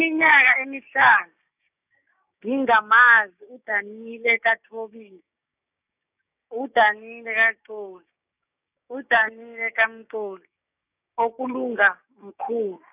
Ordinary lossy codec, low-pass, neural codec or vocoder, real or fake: none; 3.6 kHz; codec, 16 kHz, 6 kbps, DAC; fake